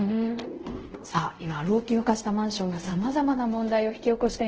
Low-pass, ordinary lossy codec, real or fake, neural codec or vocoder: 7.2 kHz; Opus, 16 kbps; fake; codec, 24 kHz, 0.5 kbps, DualCodec